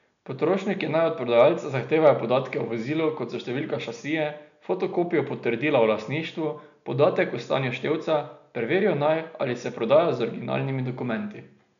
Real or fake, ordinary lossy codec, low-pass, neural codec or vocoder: real; none; 7.2 kHz; none